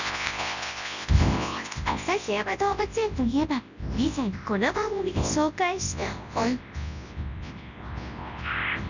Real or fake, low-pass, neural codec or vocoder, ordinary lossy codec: fake; 7.2 kHz; codec, 24 kHz, 0.9 kbps, WavTokenizer, large speech release; none